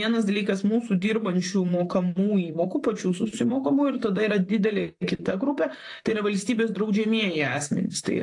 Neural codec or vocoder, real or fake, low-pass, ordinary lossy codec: vocoder, 44.1 kHz, 128 mel bands, Pupu-Vocoder; fake; 10.8 kHz; AAC, 48 kbps